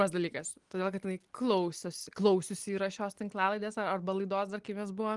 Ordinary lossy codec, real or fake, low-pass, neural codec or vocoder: Opus, 24 kbps; real; 10.8 kHz; none